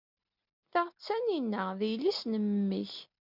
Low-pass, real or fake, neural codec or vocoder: 5.4 kHz; real; none